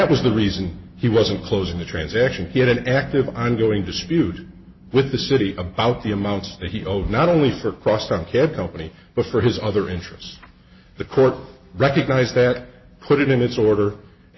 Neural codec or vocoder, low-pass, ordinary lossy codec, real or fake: none; 7.2 kHz; MP3, 24 kbps; real